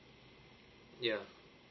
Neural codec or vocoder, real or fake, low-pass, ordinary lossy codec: none; real; 7.2 kHz; MP3, 24 kbps